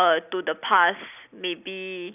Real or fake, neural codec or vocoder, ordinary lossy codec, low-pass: real; none; none; 3.6 kHz